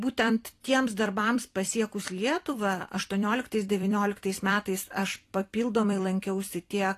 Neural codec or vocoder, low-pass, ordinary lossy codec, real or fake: vocoder, 44.1 kHz, 128 mel bands every 256 samples, BigVGAN v2; 14.4 kHz; AAC, 48 kbps; fake